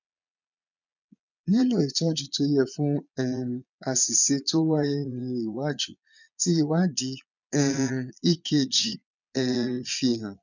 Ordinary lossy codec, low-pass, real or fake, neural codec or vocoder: none; 7.2 kHz; fake; vocoder, 22.05 kHz, 80 mel bands, Vocos